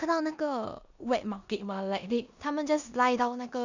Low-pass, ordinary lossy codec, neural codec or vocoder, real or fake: 7.2 kHz; none; codec, 16 kHz in and 24 kHz out, 0.9 kbps, LongCat-Audio-Codec, four codebook decoder; fake